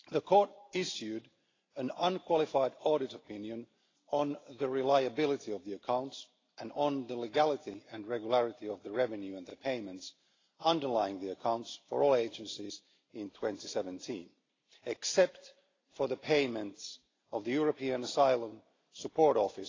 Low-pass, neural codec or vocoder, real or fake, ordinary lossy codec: 7.2 kHz; none; real; AAC, 32 kbps